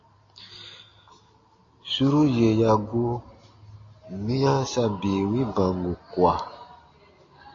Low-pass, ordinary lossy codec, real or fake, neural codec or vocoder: 7.2 kHz; AAC, 32 kbps; real; none